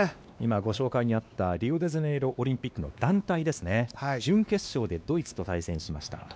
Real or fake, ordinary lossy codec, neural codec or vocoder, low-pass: fake; none; codec, 16 kHz, 2 kbps, X-Codec, WavLM features, trained on Multilingual LibriSpeech; none